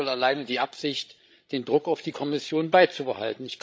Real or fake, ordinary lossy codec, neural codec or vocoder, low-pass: fake; none; codec, 16 kHz, 16 kbps, FreqCodec, larger model; none